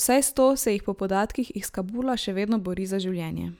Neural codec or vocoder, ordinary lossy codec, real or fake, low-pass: none; none; real; none